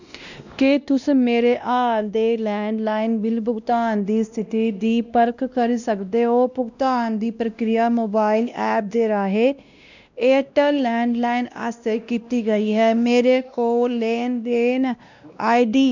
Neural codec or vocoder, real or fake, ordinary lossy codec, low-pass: codec, 16 kHz, 1 kbps, X-Codec, WavLM features, trained on Multilingual LibriSpeech; fake; none; 7.2 kHz